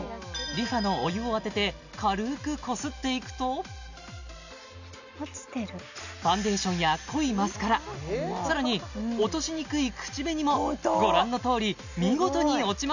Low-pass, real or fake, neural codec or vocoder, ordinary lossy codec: 7.2 kHz; real; none; none